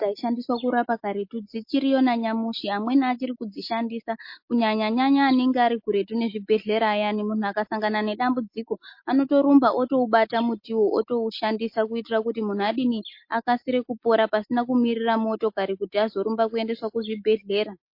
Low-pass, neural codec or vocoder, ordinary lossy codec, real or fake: 5.4 kHz; none; MP3, 32 kbps; real